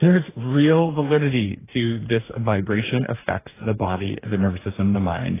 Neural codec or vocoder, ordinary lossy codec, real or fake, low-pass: codec, 44.1 kHz, 2.6 kbps, DAC; AAC, 16 kbps; fake; 3.6 kHz